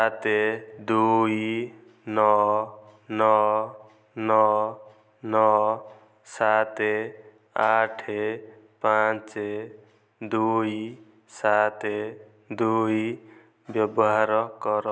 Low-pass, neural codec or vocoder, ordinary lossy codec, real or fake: none; none; none; real